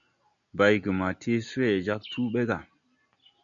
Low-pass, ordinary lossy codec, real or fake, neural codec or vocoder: 7.2 kHz; MP3, 96 kbps; real; none